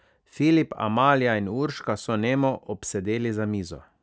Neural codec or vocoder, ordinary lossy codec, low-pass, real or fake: none; none; none; real